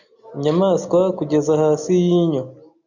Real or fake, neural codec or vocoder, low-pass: real; none; 7.2 kHz